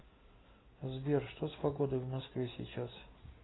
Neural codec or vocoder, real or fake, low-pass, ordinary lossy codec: none; real; 7.2 kHz; AAC, 16 kbps